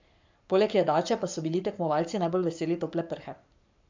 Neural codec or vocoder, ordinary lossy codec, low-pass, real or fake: codec, 44.1 kHz, 7.8 kbps, Pupu-Codec; MP3, 64 kbps; 7.2 kHz; fake